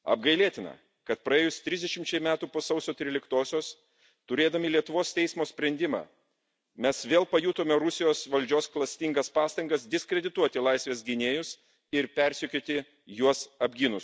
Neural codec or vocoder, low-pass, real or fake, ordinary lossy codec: none; none; real; none